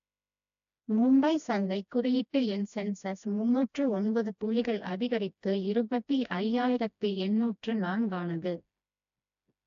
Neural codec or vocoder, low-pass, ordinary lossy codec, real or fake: codec, 16 kHz, 1 kbps, FreqCodec, smaller model; 7.2 kHz; AAC, 64 kbps; fake